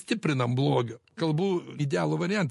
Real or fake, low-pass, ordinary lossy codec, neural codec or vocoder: real; 14.4 kHz; MP3, 48 kbps; none